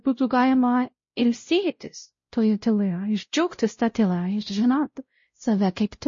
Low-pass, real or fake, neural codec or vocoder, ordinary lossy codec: 7.2 kHz; fake; codec, 16 kHz, 0.5 kbps, X-Codec, WavLM features, trained on Multilingual LibriSpeech; MP3, 32 kbps